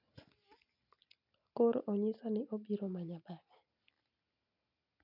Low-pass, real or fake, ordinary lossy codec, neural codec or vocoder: 5.4 kHz; real; none; none